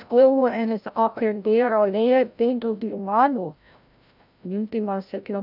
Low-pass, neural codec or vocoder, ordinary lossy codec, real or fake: 5.4 kHz; codec, 16 kHz, 0.5 kbps, FreqCodec, larger model; none; fake